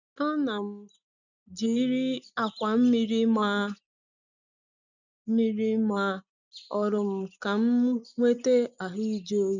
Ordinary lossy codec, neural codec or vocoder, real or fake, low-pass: none; none; real; 7.2 kHz